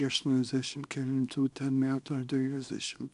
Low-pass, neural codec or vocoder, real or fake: 10.8 kHz; codec, 24 kHz, 0.9 kbps, WavTokenizer, small release; fake